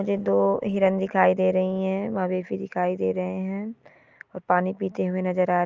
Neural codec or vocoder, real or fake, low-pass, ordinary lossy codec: none; real; 7.2 kHz; Opus, 24 kbps